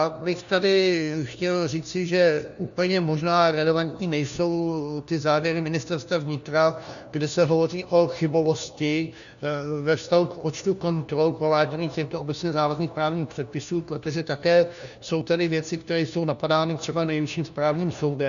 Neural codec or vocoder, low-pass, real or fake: codec, 16 kHz, 1 kbps, FunCodec, trained on LibriTTS, 50 frames a second; 7.2 kHz; fake